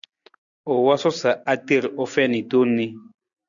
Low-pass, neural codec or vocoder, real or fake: 7.2 kHz; none; real